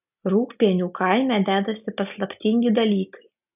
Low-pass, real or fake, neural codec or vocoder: 3.6 kHz; real; none